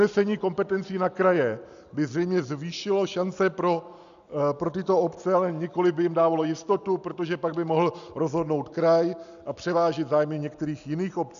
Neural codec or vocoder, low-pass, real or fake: none; 7.2 kHz; real